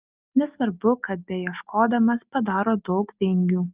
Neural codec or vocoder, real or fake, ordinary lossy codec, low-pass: none; real; Opus, 32 kbps; 3.6 kHz